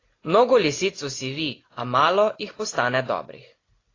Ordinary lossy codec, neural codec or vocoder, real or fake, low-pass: AAC, 32 kbps; none; real; 7.2 kHz